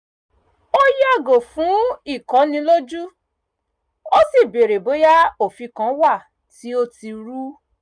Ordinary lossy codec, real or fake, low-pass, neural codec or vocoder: none; real; 9.9 kHz; none